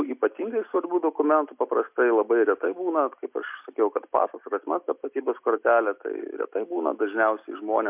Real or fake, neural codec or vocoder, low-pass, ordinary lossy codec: real; none; 3.6 kHz; MP3, 32 kbps